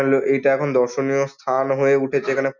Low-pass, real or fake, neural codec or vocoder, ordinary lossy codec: 7.2 kHz; real; none; none